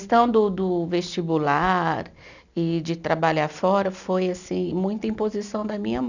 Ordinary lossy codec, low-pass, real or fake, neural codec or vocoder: none; 7.2 kHz; real; none